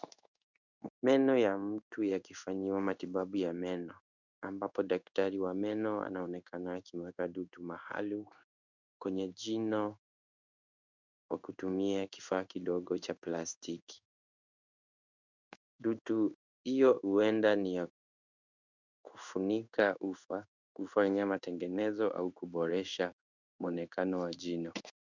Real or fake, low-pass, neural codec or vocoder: fake; 7.2 kHz; codec, 16 kHz in and 24 kHz out, 1 kbps, XY-Tokenizer